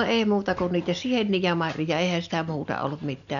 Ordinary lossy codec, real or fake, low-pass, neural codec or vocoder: none; real; 7.2 kHz; none